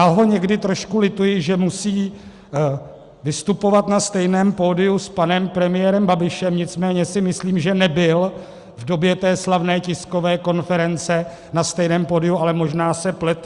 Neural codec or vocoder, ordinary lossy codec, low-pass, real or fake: none; Opus, 64 kbps; 10.8 kHz; real